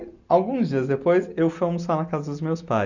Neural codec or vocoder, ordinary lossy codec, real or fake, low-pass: none; none; real; 7.2 kHz